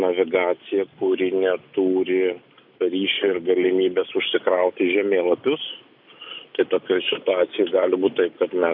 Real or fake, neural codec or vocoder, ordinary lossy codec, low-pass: real; none; AAC, 48 kbps; 5.4 kHz